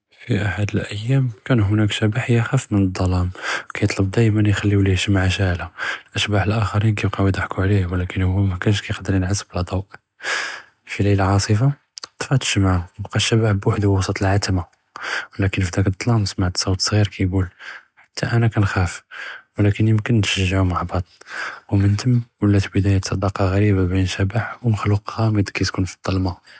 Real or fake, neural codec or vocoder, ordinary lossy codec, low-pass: real; none; none; none